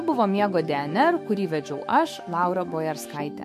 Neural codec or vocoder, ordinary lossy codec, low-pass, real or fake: autoencoder, 48 kHz, 128 numbers a frame, DAC-VAE, trained on Japanese speech; MP3, 64 kbps; 14.4 kHz; fake